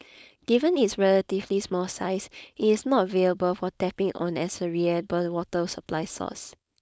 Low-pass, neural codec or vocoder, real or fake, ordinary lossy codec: none; codec, 16 kHz, 4.8 kbps, FACodec; fake; none